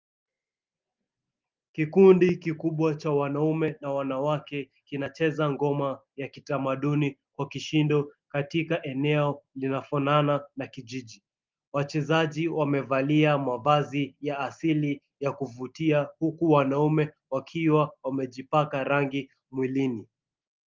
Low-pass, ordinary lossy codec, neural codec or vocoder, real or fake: 7.2 kHz; Opus, 32 kbps; none; real